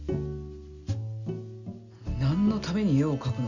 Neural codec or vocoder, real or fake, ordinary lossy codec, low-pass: none; real; none; 7.2 kHz